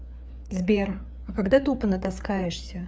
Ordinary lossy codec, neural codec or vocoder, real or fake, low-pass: none; codec, 16 kHz, 4 kbps, FreqCodec, larger model; fake; none